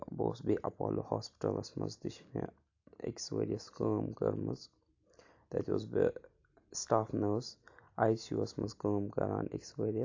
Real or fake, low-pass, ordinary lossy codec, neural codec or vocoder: real; 7.2 kHz; AAC, 48 kbps; none